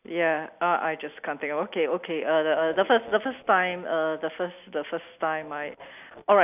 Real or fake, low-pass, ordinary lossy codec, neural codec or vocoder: real; 3.6 kHz; none; none